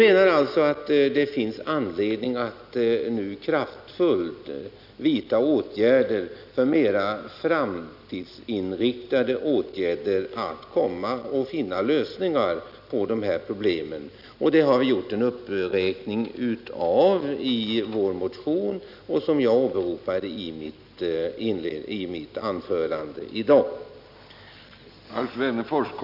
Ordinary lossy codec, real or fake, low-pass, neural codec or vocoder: none; real; 5.4 kHz; none